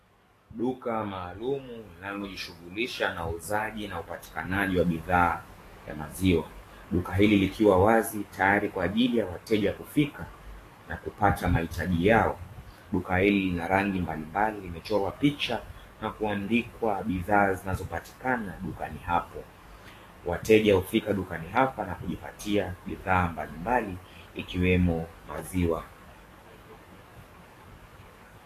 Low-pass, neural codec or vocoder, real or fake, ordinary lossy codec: 14.4 kHz; codec, 44.1 kHz, 7.8 kbps, DAC; fake; AAC, 48 kbps